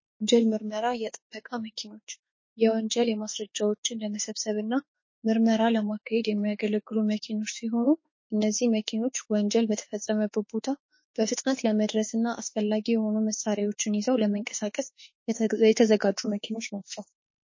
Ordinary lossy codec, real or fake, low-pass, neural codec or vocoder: MP3, 32 kbps; fake; 7.2 kHz; autoencoder, 48 kHz, 32 numbers a frame, DAC-VAE, trained on Japanese speech